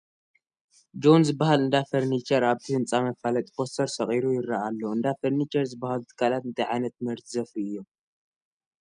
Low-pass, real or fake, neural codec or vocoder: 9.9 kHz; real; none